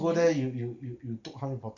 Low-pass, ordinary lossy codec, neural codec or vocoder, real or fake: 7.2 kHz; none; none; real